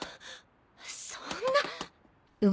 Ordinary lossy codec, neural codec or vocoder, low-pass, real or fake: none; none; none; real